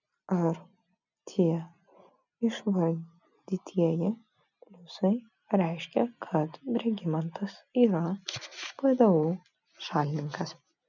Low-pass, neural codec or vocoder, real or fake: 7.2 kHz; none; real